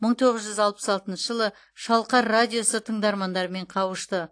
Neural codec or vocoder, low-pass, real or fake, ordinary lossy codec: none; 9.9 kHz; real; AAC, 48 kbps